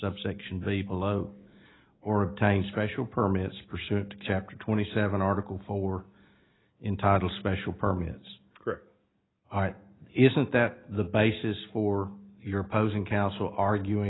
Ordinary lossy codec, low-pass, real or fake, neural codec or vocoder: AAC, 16 kbps; 7.2 kHz; real; none